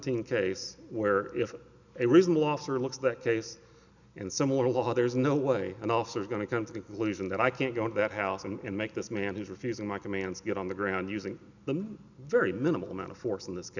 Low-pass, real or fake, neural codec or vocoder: 7.2 kHz; real; none